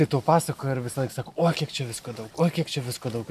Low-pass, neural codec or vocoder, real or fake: 14.4 kHz; none; real